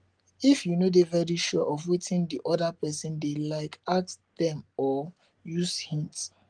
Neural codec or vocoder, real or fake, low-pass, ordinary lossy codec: none; real; 9.9 kHz; Opus, 24 kbps